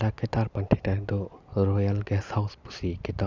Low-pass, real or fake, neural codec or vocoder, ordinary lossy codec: 7.2 kHz; real; none; none